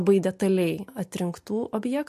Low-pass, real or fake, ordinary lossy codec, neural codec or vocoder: 14.4 kHz; real; MP3, 64 kbps; none